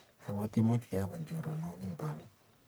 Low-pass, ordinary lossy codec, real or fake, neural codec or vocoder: none; none; fake; codec, 44.1 kHz, 1.7 kbps, Pupu-Codec